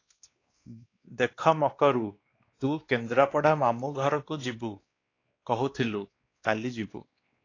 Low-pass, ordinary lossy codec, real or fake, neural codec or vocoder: 7.2 kHz; AAC, 32 kbps; fake; codec, 16 kHz, 2 kbps, X-Codec, WavLM features, trained on Multilingual LibriSpeech